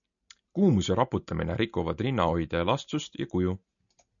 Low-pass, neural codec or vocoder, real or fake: 7.2 kHz; none; real